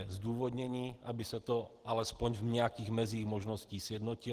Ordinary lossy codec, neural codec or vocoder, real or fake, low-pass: Opus, 16 kbps; none; real; 14.4 kHz